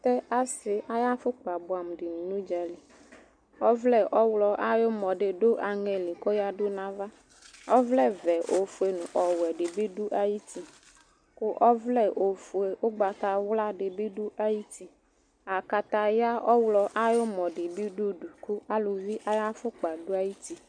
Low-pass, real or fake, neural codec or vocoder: 9.9 kHz; real; none